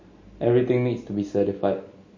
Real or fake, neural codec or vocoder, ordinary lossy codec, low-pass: real; none; MP3, 32 kbps; 7.2 kHz